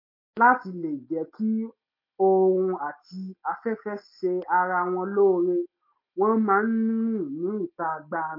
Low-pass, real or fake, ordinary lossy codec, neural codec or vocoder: 5.4 kHz; real; MP3, 32 kbps; none